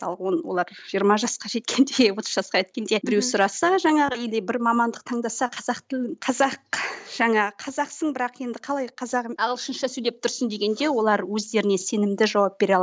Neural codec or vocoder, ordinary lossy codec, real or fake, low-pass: none; none; real; none